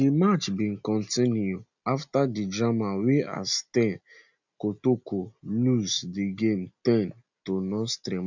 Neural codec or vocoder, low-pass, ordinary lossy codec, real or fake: none; 7.2 kHz; none; real